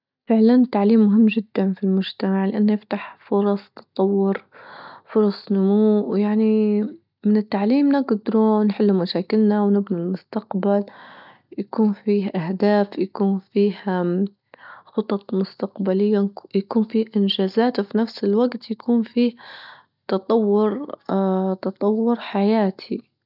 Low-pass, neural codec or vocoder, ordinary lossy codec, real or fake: 5.4 kHz; none; none; real